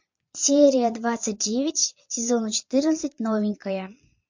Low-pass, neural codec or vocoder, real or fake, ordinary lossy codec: 7.2 kHz; vocoder, 22.05 kHz, 80 mel bands, Vocos; fake; MP3, 48 kbps